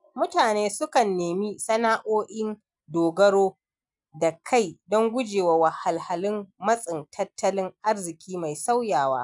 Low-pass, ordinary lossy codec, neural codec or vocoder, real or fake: 10.8 kHz; none; none; real